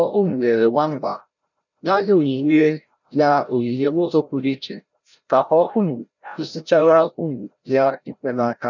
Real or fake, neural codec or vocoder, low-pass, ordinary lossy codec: fake; codec, 16 kHz, 0.5 kbps, FreqCodec, larger model; 7.2 kHz; none